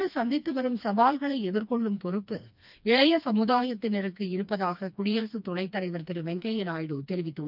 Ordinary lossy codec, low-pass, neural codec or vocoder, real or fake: none; 5.4 kHz; codec, 16 kHz, 2 kbps, FreqCodec, smaller model; fake